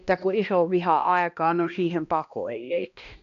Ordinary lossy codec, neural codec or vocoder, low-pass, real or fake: none; codec, 16 kHz, 1 kbps, X-Codec, HuBERT features, trained on balanced general audio; 7.2 kHz; fake